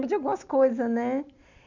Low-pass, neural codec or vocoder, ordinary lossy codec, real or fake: 7.2 kHz; none; none; real